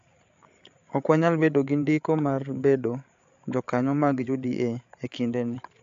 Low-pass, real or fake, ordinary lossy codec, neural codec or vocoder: 7.2 kHz; fake; AAC, 64 kbps; codec, 16 kHz, 8 kbps, FreqCodec, larger model